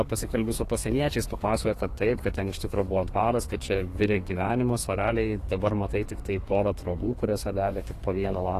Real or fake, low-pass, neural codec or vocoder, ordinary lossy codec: fake; 14.4 kHz; codec, 32 kHz, 1.9 kbps, SNAC; AAC, 48 kbps